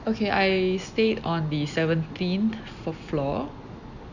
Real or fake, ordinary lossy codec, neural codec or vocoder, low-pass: real; none; none; 7.2 kHz